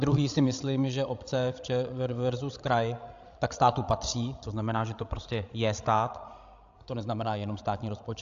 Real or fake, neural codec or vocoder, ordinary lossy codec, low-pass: fake; codec, 16 kHz, 16 kbps, FreqCodec, larger model; AAC, 64 kbps; 7.2 kHz